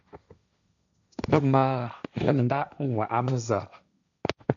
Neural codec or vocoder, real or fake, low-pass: codec, 16 kHz, 1.1 kbps, Voila-Tokenizer; fake; 7.2 kHz